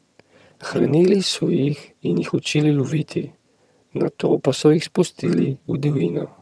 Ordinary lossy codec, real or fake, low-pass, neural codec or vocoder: none; fake; none; vocoder, 22.05 kHz, 80 mel bands, HiFi-GAN